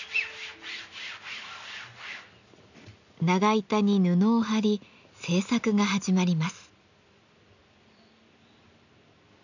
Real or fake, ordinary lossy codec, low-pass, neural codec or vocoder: real; none; 7.2 kHz; none